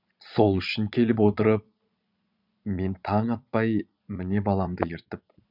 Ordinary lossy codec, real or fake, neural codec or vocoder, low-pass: none; fake; vocoder, 22.05 kHz, 80 mel bands, Vocos; 5.4 kHz